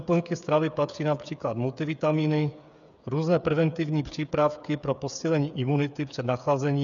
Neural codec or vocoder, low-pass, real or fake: codec, 16 kHz, 8 kbps, FreqCodec, smaller model; 7.2 kHz; fake